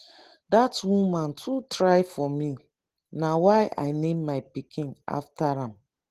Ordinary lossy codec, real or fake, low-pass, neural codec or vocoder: Opus, 16 kbps; real; 14.4 kHz; none